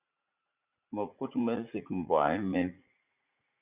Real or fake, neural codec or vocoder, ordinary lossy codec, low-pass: fake; vocoder, 44.1 kHz, 80 mel bands, Vocos; Opus, 64 kbps; 3.6 kHz